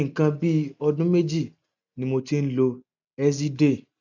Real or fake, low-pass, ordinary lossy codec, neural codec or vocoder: real; 7.2 kHz; none; none